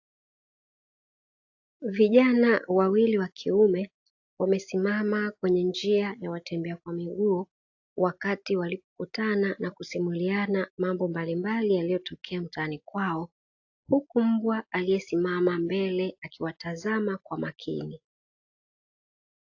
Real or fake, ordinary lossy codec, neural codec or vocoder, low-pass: real; AAC, 48 kbps; none; 7.2 kHz